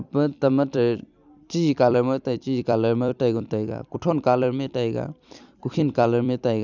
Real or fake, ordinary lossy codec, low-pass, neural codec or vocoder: fake; none; 7.2 kHz; vocoder, 44.1 kHz, 128 mel bands every 256 samples, BigVGAN v2